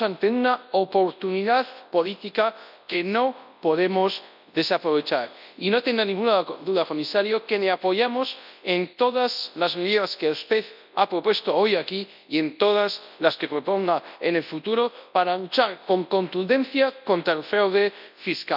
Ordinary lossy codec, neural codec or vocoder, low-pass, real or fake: none; codec, 24 kHz, 0.9 kbps, WavTokenizer, large speech release; 5.4 kHz; fake